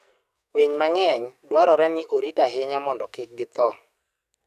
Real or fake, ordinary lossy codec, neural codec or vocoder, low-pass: fake; none; codec, 44.1 kHz, 2.6 kbps, SNAC; 14.4 kHz